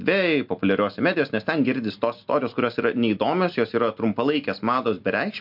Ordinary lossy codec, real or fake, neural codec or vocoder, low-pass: MP3, 48 kbps; real; none; 5.4 kHz